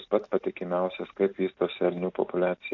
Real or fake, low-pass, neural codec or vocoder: real; 7.2 kHz; none